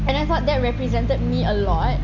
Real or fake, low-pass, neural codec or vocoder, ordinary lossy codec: real; 7.2 kHz; none; none